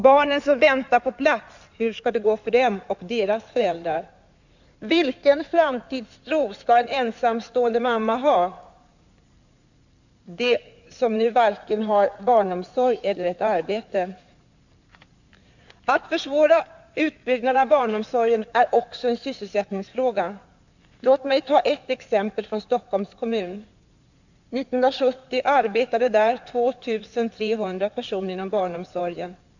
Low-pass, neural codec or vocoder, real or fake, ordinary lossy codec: 7.2 kHz; codec, 16 kHz in and 24 kHz out, 2.2 kbps, FireRedTTS-2 codec; fake; none